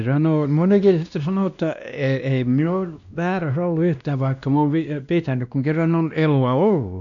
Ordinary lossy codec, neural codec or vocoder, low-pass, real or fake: none; codec, 16 kHz, 1 kbps, X-Codec, WavLM features, trained on Multilingual LibriSpeech; 7.2 kHz; fake